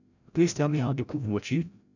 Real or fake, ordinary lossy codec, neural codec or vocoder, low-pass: fake; none; codec, 16 kHz, 0.5 kbps, FreqCodec, larger model; 7.2 kHz